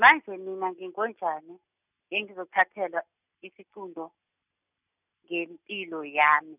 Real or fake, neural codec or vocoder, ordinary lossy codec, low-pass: real; none; none; 3.6 kHz